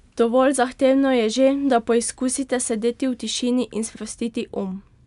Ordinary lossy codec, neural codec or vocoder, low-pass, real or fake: none; none; 10.8 kHz; real